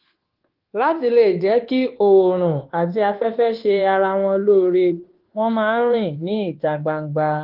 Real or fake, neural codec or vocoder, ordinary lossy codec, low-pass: fake; codec, 24 kHz, 1.2 kbps, DualCodec; Opus, 16 kbps; 5.4 kHz